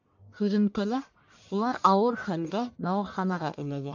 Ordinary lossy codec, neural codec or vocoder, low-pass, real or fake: MP3, 64 kbps; codec, 44.1 kHz, 1.7 kbps, Pupu-Codec; 7.2 kHz; fake